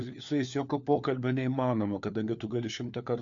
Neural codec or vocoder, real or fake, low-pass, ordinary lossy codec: codec, 16 kHz, 4 kbps, FunCodec, trained on LibriTTS, 50 frames a second; fake; 7.2 kHz; MP3, 64 kbps